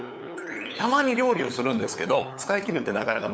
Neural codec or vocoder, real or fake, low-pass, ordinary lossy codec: codec, 16 kHz, 8 kbps, FunCodec, trained on LibriTTS, 25 frames a second; fake; none; none